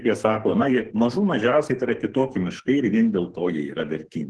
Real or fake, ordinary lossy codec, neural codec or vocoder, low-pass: fake; Opus, 16 kbps; codec, 44.1 kHz, 2.6 kbps, SNAC; 10.8 kHz